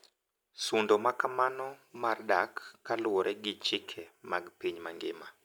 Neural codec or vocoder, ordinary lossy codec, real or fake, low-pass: none; none; real; none